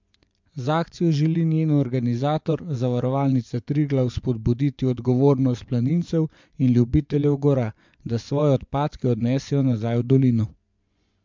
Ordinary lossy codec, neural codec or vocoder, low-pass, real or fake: MP3, 64 kbps; vocoder, 24 kHz, 100 mel bands, Vocos; 7.2 kHz; fake